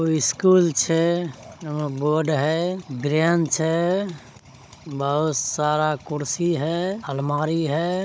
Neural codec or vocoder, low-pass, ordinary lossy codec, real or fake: codec, 16 kHz, 16 kbps, FunCodec, trained on Chinese and English, 50 frames a second; none; none; fake